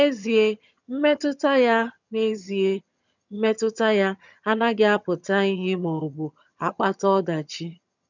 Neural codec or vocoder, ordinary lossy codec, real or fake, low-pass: vocoder, 22.05 kHz, 80 mel bands, HiFi-GAN; none; fake; 7.2 kHz